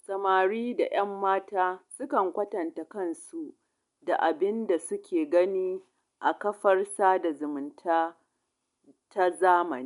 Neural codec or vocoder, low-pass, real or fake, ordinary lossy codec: none; 10.8 kHz; real; none